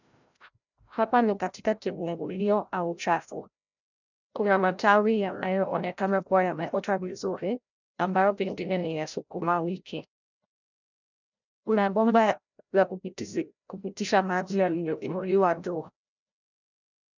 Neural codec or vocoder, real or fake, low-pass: codec, 16 kHz, 0.5 kbps, FreqCodec, larger model; fake; 7.2 kHz